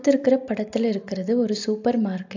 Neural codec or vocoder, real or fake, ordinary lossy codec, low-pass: none; real; AAC, 48 kbps; 7.2 kHz